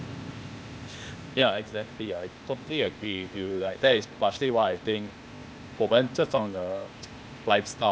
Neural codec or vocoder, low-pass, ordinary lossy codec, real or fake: codec, 16 kHz, 0.8 kbps, ZipCodec; none; none; fake